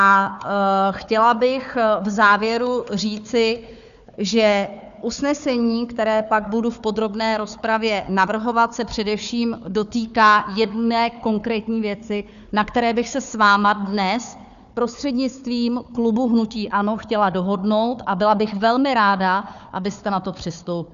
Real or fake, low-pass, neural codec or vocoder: fake; 7.2 kHz; codec, 16 kHz, 4 kbps, FunCodec, trained on Chinese and English, 50 frames a second